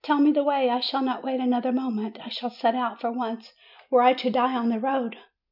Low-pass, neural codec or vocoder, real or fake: 5.4 kHz; none; real